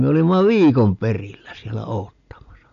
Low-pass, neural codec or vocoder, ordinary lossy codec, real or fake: 7.2 kHz; none; none; real